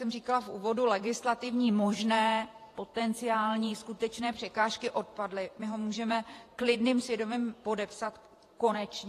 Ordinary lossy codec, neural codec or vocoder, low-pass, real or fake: AAC, 48 kbps; vocoder, 48 kHz, 128 mel bands, Vocos; 14.4 kHz; fake